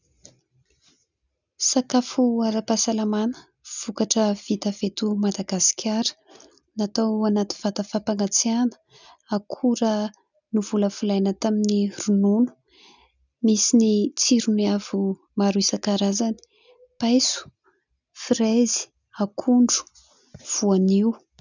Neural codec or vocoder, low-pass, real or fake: none; 7.2 kHz; real